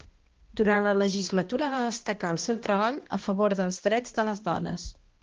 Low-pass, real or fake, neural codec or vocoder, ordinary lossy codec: 7.2 kHz; fake; codec, 16 kHz, 1 kbps, X-Codec, HuBERT features, trained on balanced general audio; Opus, 16 kbps